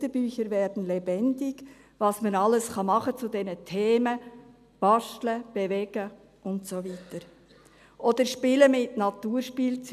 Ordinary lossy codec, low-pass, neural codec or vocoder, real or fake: none; 14.4 kHz; none; real